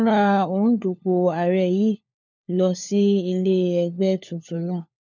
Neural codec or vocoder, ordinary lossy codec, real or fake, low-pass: codec, 16 kHz, 4 kbps, FunCodec, trained on LibriTTS, 50 frames a second; none; fake; 7.2 kHz